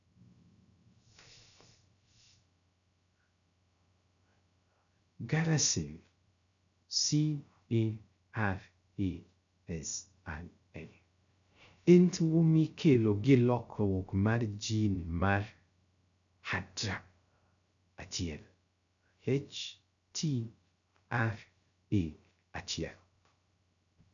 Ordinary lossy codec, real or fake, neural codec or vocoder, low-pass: none; fake; codec, 16 kHz, 0.3 kbps, FocalCodec; 7.2 kHz